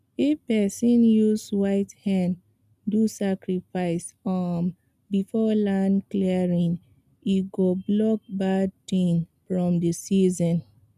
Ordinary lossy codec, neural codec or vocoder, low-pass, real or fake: none; none; 14.4 kHz; real